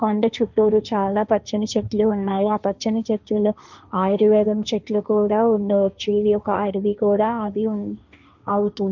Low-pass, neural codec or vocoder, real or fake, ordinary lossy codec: 7.2 kHz; codec, 16 kHz, 1.1 kbps, Voila-Tokenizer; fake; none